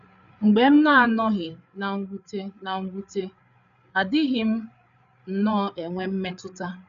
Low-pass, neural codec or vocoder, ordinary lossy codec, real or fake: 7.2 kHz; codec, 16 kHz, 8 kbps, FreqCodec, larger model; none; fake